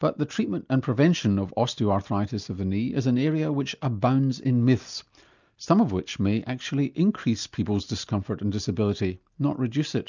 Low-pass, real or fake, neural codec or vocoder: 7.2 kHz; real; none